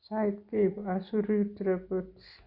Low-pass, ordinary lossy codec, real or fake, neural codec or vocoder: 5.4 kHz; Opus, 64 kbps; real; none